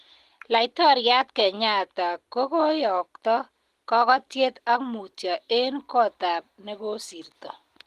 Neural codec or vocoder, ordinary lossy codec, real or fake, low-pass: none; Opus, 24 kbps; real; 14.4 kHz